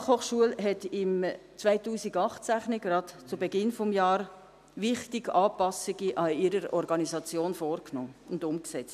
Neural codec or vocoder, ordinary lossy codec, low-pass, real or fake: none; none; 14.4 kHz; real